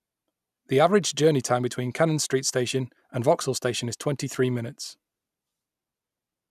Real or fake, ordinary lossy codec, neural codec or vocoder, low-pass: real; none; none; 14.4 kHz